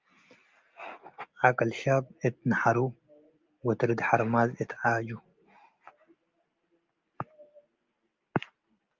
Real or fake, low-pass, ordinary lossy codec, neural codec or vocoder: real; 7.2 kHz; Opus, 24 kbps; none